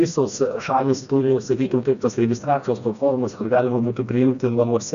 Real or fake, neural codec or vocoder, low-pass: fake; codec, 16 kHz, 1 kbps, FreqCodec, smaller model; 7.2 kHz